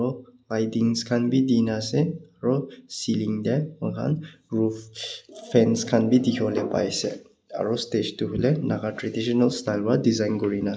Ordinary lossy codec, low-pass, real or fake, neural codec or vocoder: none; none; real; none